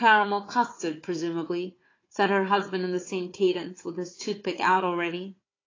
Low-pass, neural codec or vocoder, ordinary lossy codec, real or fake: 7.2 kHz; codec, 16 kHz, 4 kbps, FunCodec, trained on Chinese and English, 50 frames a second; AAC, 32 kbps; fake